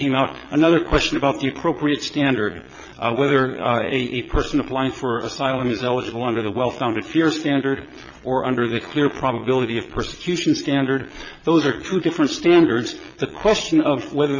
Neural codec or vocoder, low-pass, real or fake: vocoder, 22.05 kHz, 80 mel bands, Vocos; 7.2 kHz; fake